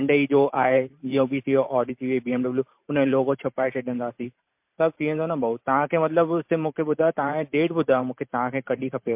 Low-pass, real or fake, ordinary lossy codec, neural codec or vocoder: 3.6 kHz; fake; MP3, 32 kbps; vocoder, 44.1 kHz, 128 mel bands every 512 samples, BigVGAN v2